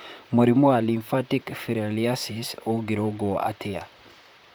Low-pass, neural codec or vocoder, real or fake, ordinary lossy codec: none; vocoder, 44.1 kHz, 128 mel bands, Pupu-Vocoder; fake; none